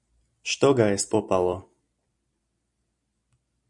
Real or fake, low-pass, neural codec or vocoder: fake; 10.8 kHz; vocoder, 44.1 kHz, 128 mel bands every 256 samples, BigVGAN v2